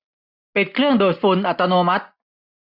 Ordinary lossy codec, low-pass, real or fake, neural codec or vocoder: none; 5.4 kHz; real; none